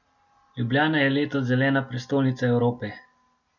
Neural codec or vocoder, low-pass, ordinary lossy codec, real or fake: none; 7.2 kHz; none; real